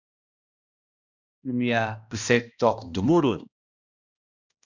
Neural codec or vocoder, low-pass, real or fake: codec, 16 kHz, 1 kbps, X-Codec, HuBERT features, trained on balanced general audio; 7.2 kHz; fake